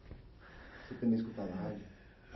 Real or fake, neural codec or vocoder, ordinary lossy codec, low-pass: real; none; MP3, 24 kbps; 7.2 kHz